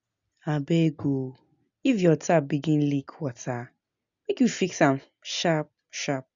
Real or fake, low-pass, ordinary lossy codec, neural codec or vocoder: real; 7.2 kHz; none; none